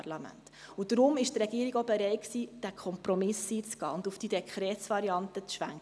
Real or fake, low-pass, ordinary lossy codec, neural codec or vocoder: real; none; none; none